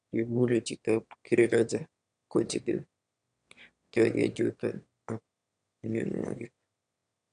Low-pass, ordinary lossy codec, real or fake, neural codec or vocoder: 9.9 kHz; none; fake; autoencoder, 22.05 kHz, a latent of 192 numbers a frame, VITS, trained on one speaker